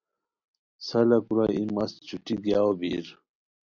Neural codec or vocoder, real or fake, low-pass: none; real; 7.2 kHz